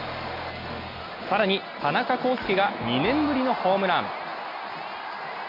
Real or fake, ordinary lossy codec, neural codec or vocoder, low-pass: real; none; none; 5.4 kHz